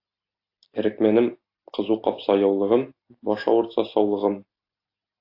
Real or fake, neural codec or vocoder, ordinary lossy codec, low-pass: real; none; AAC, 32 kbps; 5.4 kHz